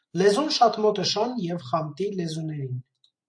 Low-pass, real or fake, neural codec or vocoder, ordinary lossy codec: 9.9 kHz; real; none; MP3, 48 kbps